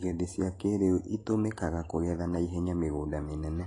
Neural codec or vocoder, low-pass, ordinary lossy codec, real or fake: none; 10.8 kHz; AAC, 32 kbps; real